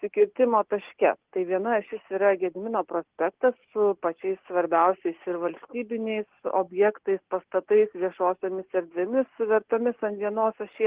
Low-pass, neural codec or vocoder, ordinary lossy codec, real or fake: 3.6 kHz; none; Opus, 16 kbps; real